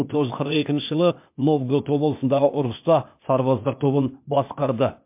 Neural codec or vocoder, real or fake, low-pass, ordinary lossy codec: codec, 16 kHz, 0.8 kbps, ZipCodec; fake; 3.6 kHz; MP3, 32 kbps